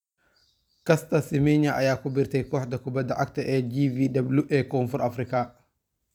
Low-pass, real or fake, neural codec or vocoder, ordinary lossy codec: 19.8 kHz; fake; vocoder, 44.1 kHz, 128 mel bands every 256 samples, BigVGAN v2; none